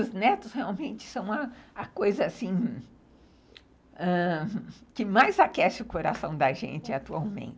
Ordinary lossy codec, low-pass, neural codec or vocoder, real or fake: none; none; none; real